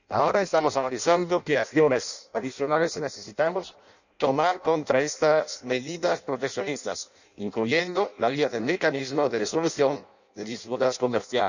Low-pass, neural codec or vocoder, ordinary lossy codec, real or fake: 7.2 kHz; codec, 16 kHz in and 24 kHz out, 0.6 kbps, FireRedTTS-2 codec; none; fake